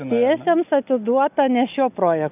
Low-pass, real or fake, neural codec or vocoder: 3.6 kHz; real; none